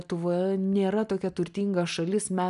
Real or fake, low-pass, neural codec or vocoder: real; 10.8 kHz; none